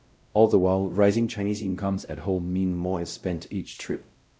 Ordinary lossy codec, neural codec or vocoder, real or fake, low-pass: none; codec, 16 kHz, 0.5 kbps, X-Codec, WavLM features, trained on Multilingual LibriSpeech; fake; none